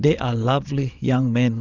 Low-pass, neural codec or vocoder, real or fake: 7.2 kHz; vocoder, 22.05 kHz, 80 mel bands, Vocos; fake